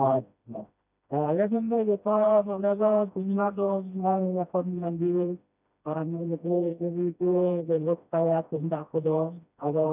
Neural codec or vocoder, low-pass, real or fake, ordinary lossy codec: codec, 16 kHz, 1 kbps, FreqCodec, smaller model; 3.6 kHz; fake; none